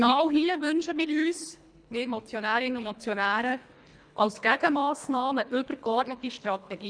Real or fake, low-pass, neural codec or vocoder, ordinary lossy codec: fake; 9.9 kHz; codec, 24 kHz, 1.5 kbps, HILCodec; none